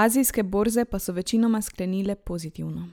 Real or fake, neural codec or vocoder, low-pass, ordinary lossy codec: real; none; none; none